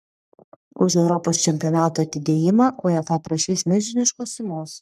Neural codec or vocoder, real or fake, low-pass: codec, 44.1 kHz, 3.4 kbps, Pupu-Codec; fake; 14.4 kHz